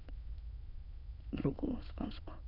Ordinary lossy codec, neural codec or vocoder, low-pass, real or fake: AAC, 48 kbps; autoencoder, 22.05 kHz, a latent of 192 numbers a frame, VITS, trained on many speakers; 5.4 kHz; fake